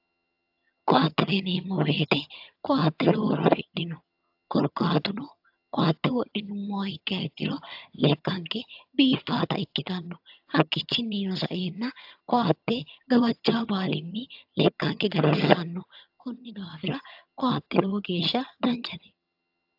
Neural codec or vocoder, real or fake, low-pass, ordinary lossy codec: vocoder, 22.05 kHz, 80 mel bands, HiFi-GAN; fake; 5.4 kHz; AAC, 48 kbps